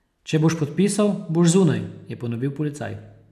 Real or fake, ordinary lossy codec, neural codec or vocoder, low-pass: real; none; none; 14.4 kHz